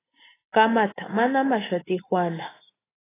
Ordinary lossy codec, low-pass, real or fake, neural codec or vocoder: AAC, 16 kbps; 3.6 kHz; real; none